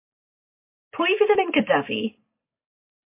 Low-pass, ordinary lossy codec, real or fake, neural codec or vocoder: 3.6 kHz; MP3, 24 kbps; real; none